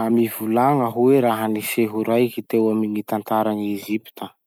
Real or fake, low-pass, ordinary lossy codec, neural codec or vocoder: real; none; none; none